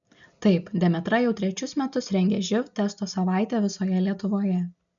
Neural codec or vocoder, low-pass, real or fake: none; 7.2 kHz; real